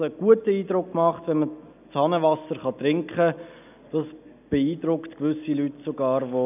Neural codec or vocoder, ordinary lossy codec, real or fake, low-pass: none; none; real; 3.6 kHz